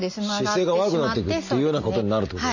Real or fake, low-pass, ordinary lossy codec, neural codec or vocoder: real; 7.2 kHz; none; none